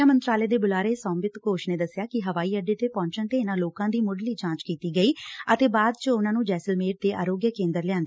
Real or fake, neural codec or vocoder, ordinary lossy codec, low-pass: real; none; none; none